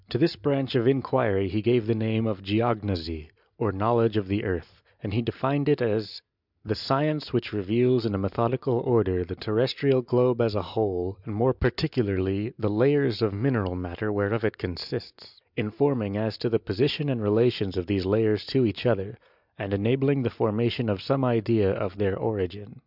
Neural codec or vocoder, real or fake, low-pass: none; real; 5.4 kHz